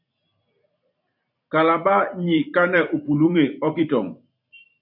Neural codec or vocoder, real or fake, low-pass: vocoder, 44.1 kHz, 128 mel bands every 256 samples, BigVGAN v2; fake; 5.4 kHz